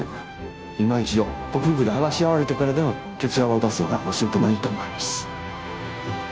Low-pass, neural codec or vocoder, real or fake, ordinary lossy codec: none; codec, 16 kHz, 0.5 kbps, FunCodec, trained on Chinese and English, 25 frames a second; fake; none